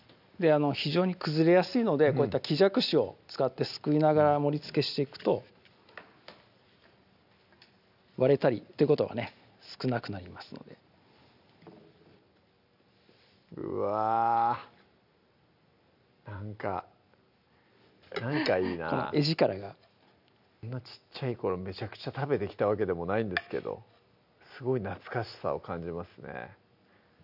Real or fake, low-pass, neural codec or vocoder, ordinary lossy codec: real; 5.4 kHz; none; none